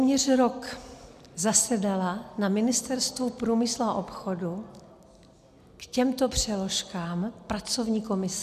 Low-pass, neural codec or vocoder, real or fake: 14.4 kHz; none; real